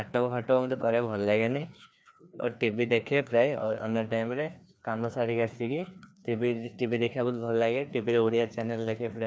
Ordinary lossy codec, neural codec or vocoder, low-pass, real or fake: none; codec, 16 kHz, 2 kbps, FreqCodec, larger model; none; fake